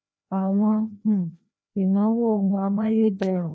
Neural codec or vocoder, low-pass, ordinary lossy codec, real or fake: codec, 16 kHz, 1 kbps, FreqCodec, larger model; none; none; fake